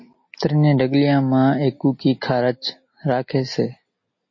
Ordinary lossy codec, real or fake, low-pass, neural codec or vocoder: MP3, 32 kbps; real; 7.2 kHz; none